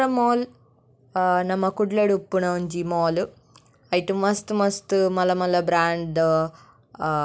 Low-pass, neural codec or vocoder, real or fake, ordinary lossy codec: none; none; real; none